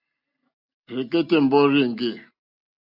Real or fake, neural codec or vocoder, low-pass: real; none; 5.4 kHz